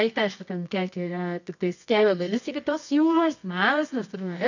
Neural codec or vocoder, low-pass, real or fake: codec, 24 kHz, 0.9 kbps, WavTokenizer, medium music audio release; 7.2 kHz; fake